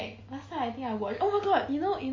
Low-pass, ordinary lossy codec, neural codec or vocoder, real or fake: 7.2 kHz; MP3, 32 kbps; none; real